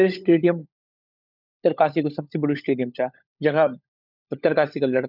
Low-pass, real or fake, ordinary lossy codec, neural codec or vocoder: 5.4 kHz; fake; none; codec, 16 kHz, 16 kbps, FunCodec, trained on LibriTTS, 50 frames a second